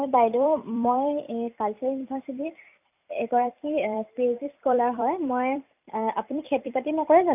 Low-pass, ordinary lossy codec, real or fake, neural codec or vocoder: 3.6 kHz; none; fake; vocoder, 44.1 kHz, 128 mel bands every 256 samples, BigVGAN v2